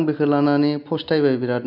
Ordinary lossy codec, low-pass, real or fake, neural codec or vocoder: none; 5.4 kHz; real; none